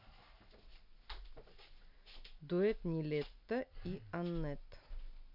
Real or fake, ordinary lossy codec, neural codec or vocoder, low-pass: real; none; none; 5.4 kHz